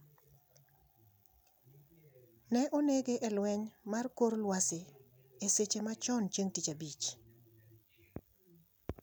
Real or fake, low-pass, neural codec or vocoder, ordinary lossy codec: real; none; none; none